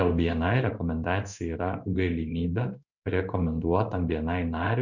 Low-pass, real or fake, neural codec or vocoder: 7.2 kHz; fake; codec, 16 kHz in and 24 kHz out, 1 kbps, XY-Tokenizer